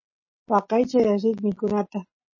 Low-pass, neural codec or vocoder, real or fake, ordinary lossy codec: 7.2 kHz; none; real; MP3, 32 kbps